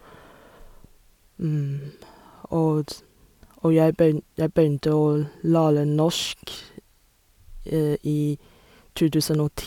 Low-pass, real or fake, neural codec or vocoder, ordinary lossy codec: 19.8 kHz; real; none; none